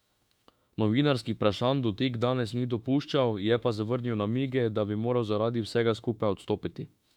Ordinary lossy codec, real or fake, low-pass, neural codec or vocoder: none; fake; 19.8 kHz; autoencoder, 48 kHz, 32 numbers a frame, DAC-VAE, trained on Japanese speech